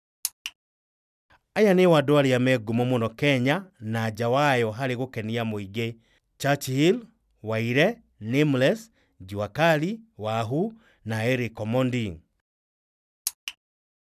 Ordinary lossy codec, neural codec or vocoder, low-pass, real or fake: none; none; 14.4 kHz; real